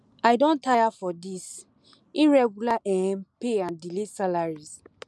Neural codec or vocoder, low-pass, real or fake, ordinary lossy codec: none; none; real; none